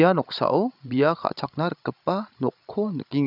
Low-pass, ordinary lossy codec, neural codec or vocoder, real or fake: 5.4 kHz; none; codec, 16 kHz, 16 kbps, FreqCodec, larger model; fake